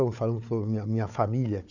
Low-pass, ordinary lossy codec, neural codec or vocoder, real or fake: 7.2 kHz; none; codec, 16 kHz, 4 kbps, FunCodec, trained on Chinese and English, 50 frames a second; fake